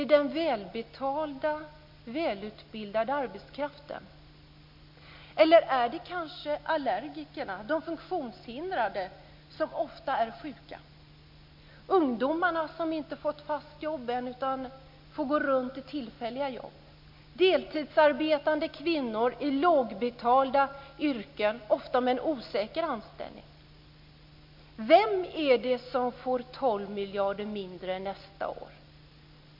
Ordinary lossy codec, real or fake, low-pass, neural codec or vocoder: MP3, 48 kbps; real; 5.4 kHz; none